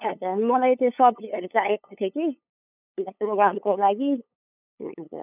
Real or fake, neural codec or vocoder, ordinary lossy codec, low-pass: fake; codec, 16 kHz, 8 kbps, FunCodec, trained on LibriTTS, 25 frames a second; none; 3.6 kHz